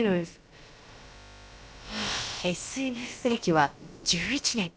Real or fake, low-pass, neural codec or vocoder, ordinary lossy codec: fake; none; codec, 16 kHz, about 1 kbps, DyCAST, with the encoder's durations; none